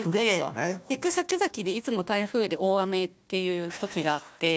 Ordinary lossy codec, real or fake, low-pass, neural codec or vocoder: none; fake; none; codec, 16 kHz, 1 kbps, FunCodec, trained on Chinese and English, 50 frames a second